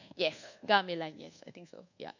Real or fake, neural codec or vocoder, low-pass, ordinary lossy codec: fake; codec, 24 kHz, 1.2 kbps, DualCodec; 7.2 kHz; none